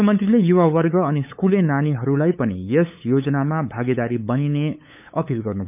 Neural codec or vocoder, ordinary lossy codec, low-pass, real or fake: codec, 16 kHz, 8 kbps, FunCodec, trained on LibriTTS, 25 frames a second; none; 3.6 kHz; fake